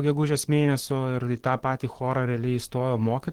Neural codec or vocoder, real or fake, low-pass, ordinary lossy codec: codec, 44.1 kHz, 7.8 kbps, DAC; fake; 19.8 kHz; Opus, 16 kbps